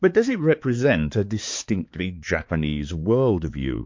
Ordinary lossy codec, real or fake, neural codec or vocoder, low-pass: MP3, 48 kbps; fake; codec, 16 kHz, 4 kbps, X-Codec, HuBERT features, trained on balanced general audio; 7.2 kHz